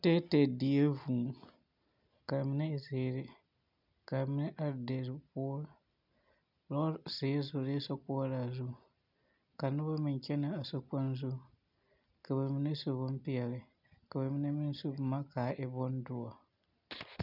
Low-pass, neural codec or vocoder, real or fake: 5.4 kHz; none; real